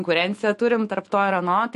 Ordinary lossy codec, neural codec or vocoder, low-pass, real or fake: MP3, 48 kbps; autoencoder, 48 kHz, 128 numbers a frame, DAC-VAE, trained on Japanese speech; 14.4 kHz; fake